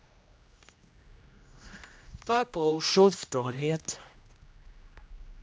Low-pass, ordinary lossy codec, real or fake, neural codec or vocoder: none; none; fake; codec, 16 kHz, 1 kbps, X-Codec, HuBERT features, trained on general audio